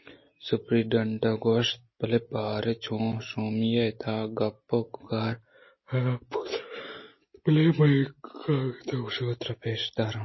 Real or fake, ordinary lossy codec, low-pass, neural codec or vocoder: real; MP3, 24 kbps; 7.2 kHz; none